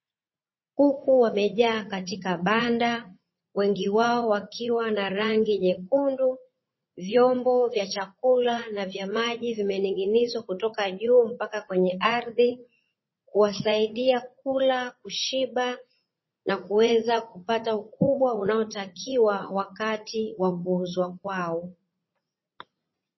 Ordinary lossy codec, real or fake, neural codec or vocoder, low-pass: MP3, 24 kbps; fake; vocoder, 44.1 kHz, 80 mel bands, Vocos; 7.2 kHz